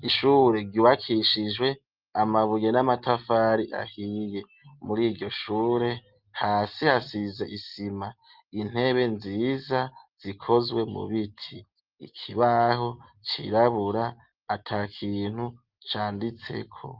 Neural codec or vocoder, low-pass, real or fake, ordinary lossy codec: none; 5.4 kHz; real; Opus, 32 kbps